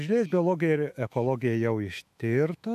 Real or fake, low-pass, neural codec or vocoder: fake; 14.4 kHz; autoencoder, 48 kHz, 128 numbers a frame, DAC-VAE, trained on Japanese speech